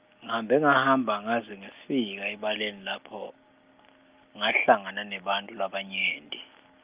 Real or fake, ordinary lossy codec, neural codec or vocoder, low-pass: real; Opus, 24 kbps; none; 3.6 kHz